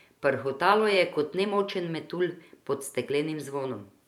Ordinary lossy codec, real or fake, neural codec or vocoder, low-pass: none; fake; vocoder, 44.1 kHz, 128 mel bands every 512 samples, BigVGAN v2; 19.8 kHz